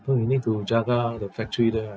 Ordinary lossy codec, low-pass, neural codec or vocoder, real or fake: none; none; none; real